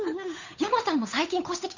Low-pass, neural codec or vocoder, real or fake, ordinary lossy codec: 7.2 kHz; codec, 16 kHz, 8 kbps, FunCodec, trained on Chinese and English, 25 frames a second; fake; none